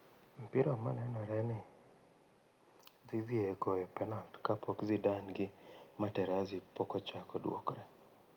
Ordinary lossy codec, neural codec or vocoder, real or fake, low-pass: Opus, 32 kbps; none; real; 19.8 kHz